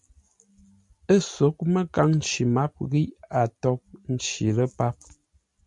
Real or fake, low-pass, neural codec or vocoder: real; 10.8 kHz; none